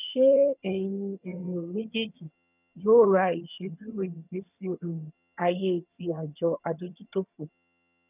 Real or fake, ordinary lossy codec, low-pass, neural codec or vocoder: fake; none; 3.6 kHz; vocoder, 22.05 kHz, 80 mel bands, HiFi-GAN